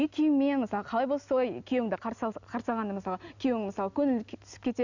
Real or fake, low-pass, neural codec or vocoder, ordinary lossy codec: real; 7.2 kHz; none; none